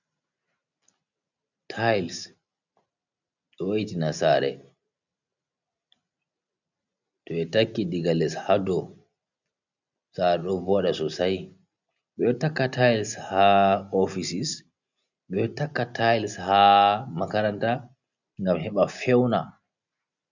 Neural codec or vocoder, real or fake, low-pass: none; real; 7.2 kHz